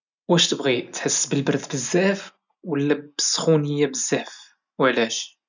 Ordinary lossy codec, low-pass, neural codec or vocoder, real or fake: none; 7.2 kHz; none; real